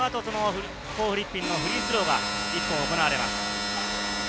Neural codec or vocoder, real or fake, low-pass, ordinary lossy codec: none; real; none; none